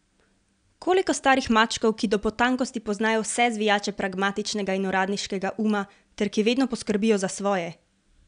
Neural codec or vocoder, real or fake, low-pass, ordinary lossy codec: none; real; 9.9 kHz; none